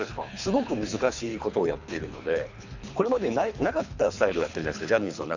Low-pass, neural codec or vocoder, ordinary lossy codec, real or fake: 7.2 kHz; codec, 24 kHz, 3 kbps, HILCodec; none; fake